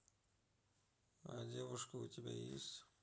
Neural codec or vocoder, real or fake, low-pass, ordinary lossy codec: none; real; none; none